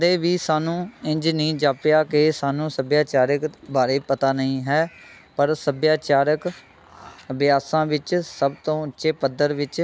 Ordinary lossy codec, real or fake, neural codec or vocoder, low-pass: none; real; none; none